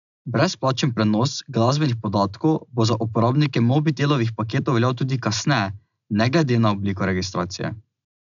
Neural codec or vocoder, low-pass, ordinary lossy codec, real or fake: none; 7.2 kHz; MP3, 96 kbps; real